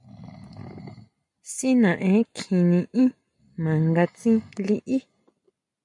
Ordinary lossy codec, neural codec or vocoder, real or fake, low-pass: MP3, 96 kbps; none; real; 10.8 kHz